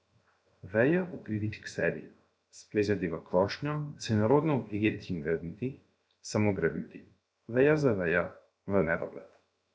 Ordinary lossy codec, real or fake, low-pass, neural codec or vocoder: none; fake; none; codec, 16 kHz, 0.7 kbps, FocalCodec